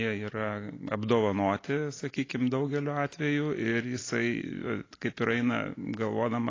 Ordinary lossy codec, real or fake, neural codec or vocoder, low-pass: AAC, 32 kbps; real; none; 7.2 kHz